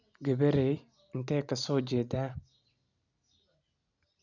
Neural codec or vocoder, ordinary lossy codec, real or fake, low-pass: none; none; real; 7.2 kHz